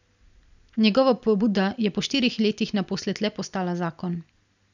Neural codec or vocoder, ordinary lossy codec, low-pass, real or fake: none; none; 7.2 kHz; real